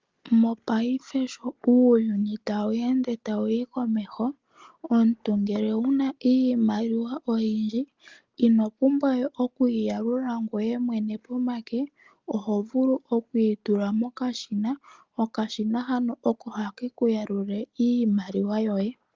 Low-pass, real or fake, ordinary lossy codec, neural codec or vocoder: 7.2 kHz; real; Opus, 32 kbps; none